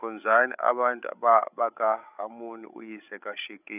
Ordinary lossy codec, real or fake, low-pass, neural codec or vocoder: none; fake; 3.6 kHz; codec, 16 kHz, 16 kbps, FreqCodec, larger model